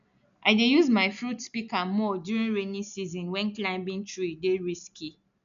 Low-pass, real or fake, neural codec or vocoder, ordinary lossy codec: 7.2 kHz; real; none; none